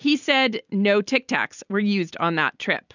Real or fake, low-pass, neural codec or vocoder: real; 7.2 kHz; none